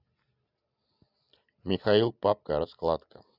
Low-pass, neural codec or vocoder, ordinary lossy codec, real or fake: 5.4 kHz; none; none; real